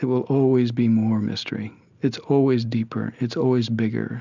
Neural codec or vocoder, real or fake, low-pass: none; real; 7.2 kHz